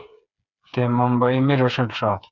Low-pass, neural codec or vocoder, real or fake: 7.2 kHz; codec, 16 kHz, 4 kbps, FreqCodec, smaller model; fake